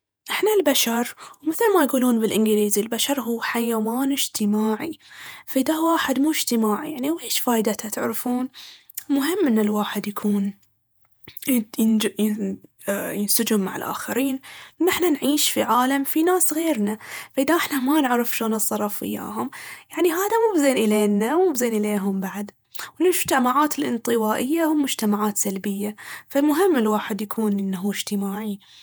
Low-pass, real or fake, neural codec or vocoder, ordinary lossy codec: none; fake; vocoder, 48 kHz, 128 mel bands, Vocos; none